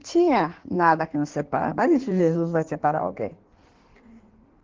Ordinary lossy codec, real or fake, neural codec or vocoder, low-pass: Opus, 16 kbps; fake; codec, 16 kHz in and 24 kHz out, 1.1 kbps, FireRedTTS-2 codec; 7.2 kHz